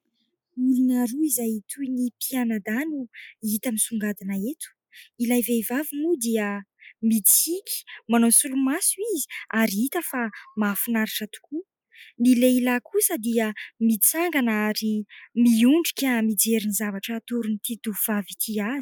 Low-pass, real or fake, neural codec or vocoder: 19.8 kHz; real; none